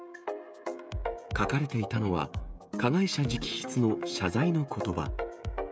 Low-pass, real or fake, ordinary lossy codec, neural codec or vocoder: none; fake; none; codec, 16 kHz, 16 kbps, FreqCodec, smaller model